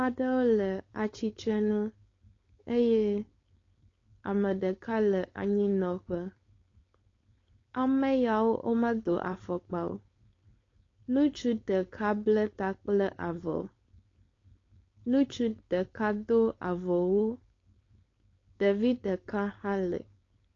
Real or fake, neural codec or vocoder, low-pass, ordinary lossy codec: fake; codec, 16 kHz, 4.8 kbps, FACodec; 7.2 kHz; AAC, 32 kbps